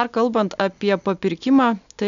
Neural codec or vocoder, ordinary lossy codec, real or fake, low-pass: none; MP3, 96 kbps; real; 7.2 kHz